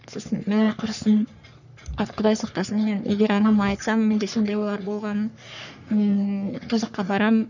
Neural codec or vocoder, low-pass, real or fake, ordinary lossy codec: codec, 44.1 kHz, 3.4 kbps, Pupu-Codec; 7.2 kHz; fake; none